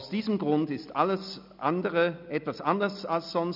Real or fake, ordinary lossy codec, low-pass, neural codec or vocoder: real; none; 5.4 kHz; none